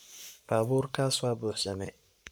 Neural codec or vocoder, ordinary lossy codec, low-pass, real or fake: codec, 44.1 kHz, 7.8 kbps, Pupu-Codec; none; none; fake